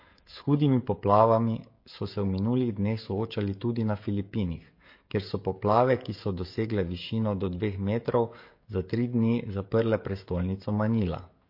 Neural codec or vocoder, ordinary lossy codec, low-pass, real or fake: codec, 16 kHz, 16 kbps, FreqCodec, smaller model; MP3, 32 kbps; 5.4 kHz; fake